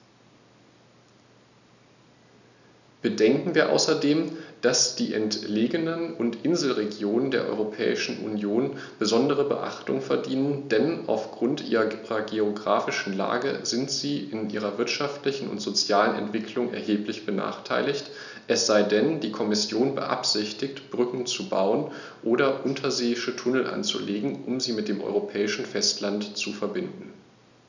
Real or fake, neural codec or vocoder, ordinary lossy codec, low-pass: real; none; none; 7.2 kHz